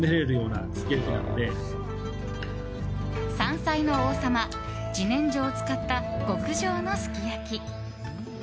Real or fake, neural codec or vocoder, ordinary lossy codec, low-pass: real; none; none; none